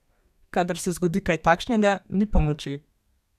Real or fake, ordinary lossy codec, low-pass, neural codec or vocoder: fake; none; 14.4 kHz; codec, 32 kHz, 1.9 kbps, SNAC